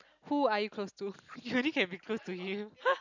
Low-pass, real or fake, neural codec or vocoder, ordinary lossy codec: 7.2 kHz; real; none; none